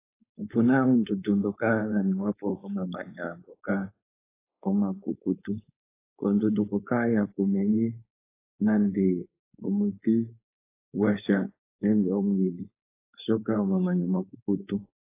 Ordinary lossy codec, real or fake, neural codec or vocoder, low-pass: AAC, 24 kbps; fake; codec, 16 kHz, 4.8 kbps, FACodec; 3.6 kHz